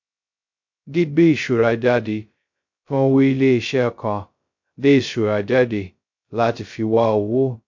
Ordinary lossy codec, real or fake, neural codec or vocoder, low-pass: MP3, 48 kbps; fake; codec, 16 kHz, 0.2 kbps, FocalCodec; 7.2 kHz